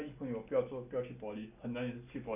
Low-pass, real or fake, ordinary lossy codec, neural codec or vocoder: 3.6 kHz; real; none; none